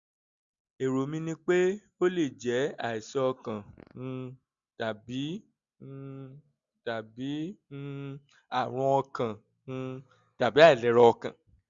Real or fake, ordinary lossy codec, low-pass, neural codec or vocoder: real; Opus, 64 kbps; 7.2 kHz; none